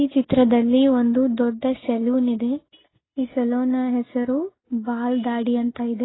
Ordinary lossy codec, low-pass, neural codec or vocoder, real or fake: AAC, 16 kbps; 7.2 kHz; none; real